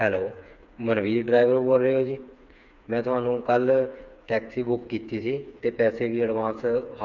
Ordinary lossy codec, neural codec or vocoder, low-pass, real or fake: none; codec, 16 kHz, 4 kbps, FreqCodec, smaller model; 7.2 kHz; fake